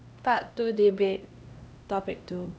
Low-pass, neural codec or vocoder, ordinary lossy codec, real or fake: none; codec, 16 kHz, 1 kbps, X-Codec, HuBERT features, trained on LibriSpeech; none; fake